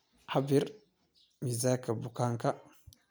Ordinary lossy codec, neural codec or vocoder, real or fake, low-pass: none; none; real; none